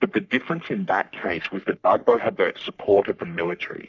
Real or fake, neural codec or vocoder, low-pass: fake; codec, 44.1 kHz, 3.4 kbps, Pupu-Codec; 7.2 kHz